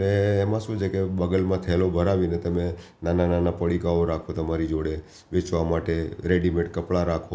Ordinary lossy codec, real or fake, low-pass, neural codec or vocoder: none; real; none; none